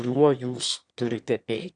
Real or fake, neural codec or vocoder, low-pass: fake; autoencoder, 22.05 kHz, a latent of 192 numbers a frame, VITS, trained on one speaker; 9.9 kHz